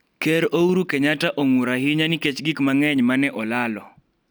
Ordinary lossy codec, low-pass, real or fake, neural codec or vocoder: none; none; real; none